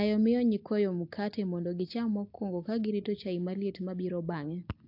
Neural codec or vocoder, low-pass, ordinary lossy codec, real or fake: none; 5.4 kHz; AAC, 48 kbps; real